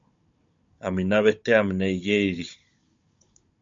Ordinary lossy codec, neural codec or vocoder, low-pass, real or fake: MP3, 48 kbps; codec, 16 kHz, 16 kbps, FunCodec, trained on Chinese and English, 50 frames a second; 7.2 kHz; fake